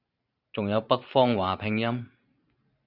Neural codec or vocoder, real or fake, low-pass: vocoder, 44.1 kHz, 128 mel bands every 512 samples, BigVGAN v2; fake; 5.4 kHz